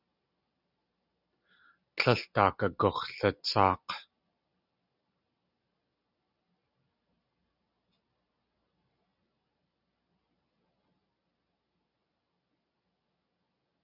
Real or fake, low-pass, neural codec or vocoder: real; 5.4 kHz; none